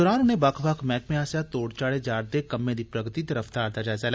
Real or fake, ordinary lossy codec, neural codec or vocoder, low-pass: real; none; none; none